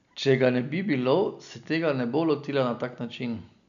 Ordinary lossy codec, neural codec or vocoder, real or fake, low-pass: none; none; real; 7.2 kHz